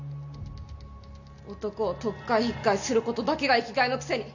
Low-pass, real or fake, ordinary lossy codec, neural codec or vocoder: 7.2 kHz; real; none; none